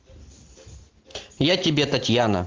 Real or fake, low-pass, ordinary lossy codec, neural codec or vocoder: real; 7.2 kHz; Opus, 16 kbps; none